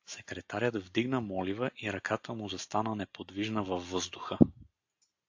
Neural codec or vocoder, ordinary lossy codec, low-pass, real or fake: none; AAC, 48 kbps; 7.2 kHz; real